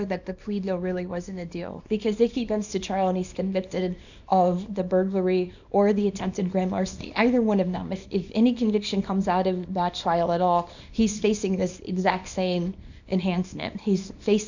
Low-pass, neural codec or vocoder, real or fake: 7.2 kHz; codec, 24 kHz, 0.9 kbps, WavTokenizer, small release; fake